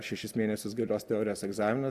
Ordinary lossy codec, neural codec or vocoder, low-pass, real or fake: Opus, 64 kbps; none; 14.4 kHz; real